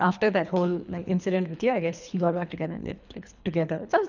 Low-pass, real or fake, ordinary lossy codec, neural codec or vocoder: 7.2 kHz; fake; none; codec, 24 kHz, 3 kbps, HILCodec